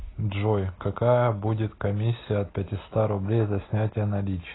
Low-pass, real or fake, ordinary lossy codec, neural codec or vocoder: 7.2 kHz; real; AAC, 16 kbps; none